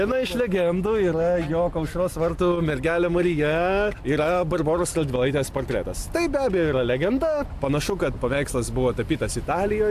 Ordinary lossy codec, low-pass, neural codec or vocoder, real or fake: AAC, 96 kbps; 14.4 kHz; none; real